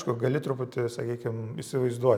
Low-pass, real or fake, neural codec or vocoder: 19.8 kHz; real; none